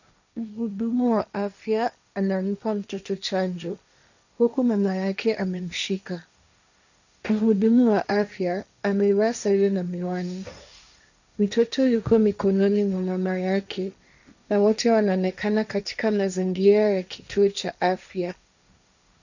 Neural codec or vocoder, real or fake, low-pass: codec, 16 kHz, 1.1 kbps, Voila-Tokenizer; fake; 7.2 kHz